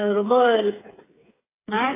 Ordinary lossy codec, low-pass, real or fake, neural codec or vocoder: AAC, 16 kbps; 3.6 kHz; fake; codec, 16 kHz, 8 kbps, FreqCodec, smaller model